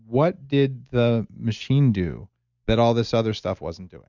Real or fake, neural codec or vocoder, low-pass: real; none; 7.2 kHz